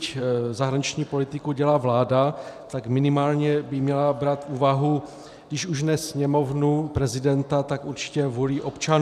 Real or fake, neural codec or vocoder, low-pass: fake; vocoder, 44.1 kHz, 128 mel bands every 512 samples, BigVGAN v2; 14.4 kHz